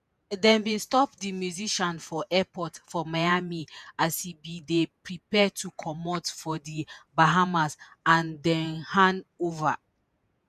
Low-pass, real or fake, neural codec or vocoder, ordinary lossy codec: 14.4 kHz; fake; vocoder, 48 kHz, 128 mel bands, Vocos; none